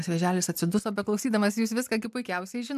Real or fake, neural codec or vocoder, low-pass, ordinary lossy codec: real; none; 14.4 kHz; MP3, 96 kbps